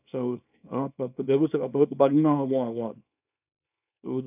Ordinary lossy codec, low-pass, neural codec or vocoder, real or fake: none; 3.6 kHz; codec, 24 kHz, 0.9 kbps, WavTokenizer, small release; fake